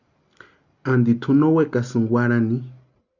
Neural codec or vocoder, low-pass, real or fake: none; 7.2 kHz; real